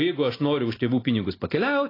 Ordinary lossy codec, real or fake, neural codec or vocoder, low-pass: AAC, 24 kbps; real; none; 5.4 kHz